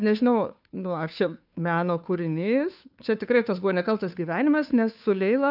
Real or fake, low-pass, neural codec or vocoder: fake; 5.4 kHz; codec, 16 kHz, 2 kbps, FunCodec, trained on Chinese and English, 25 frames a second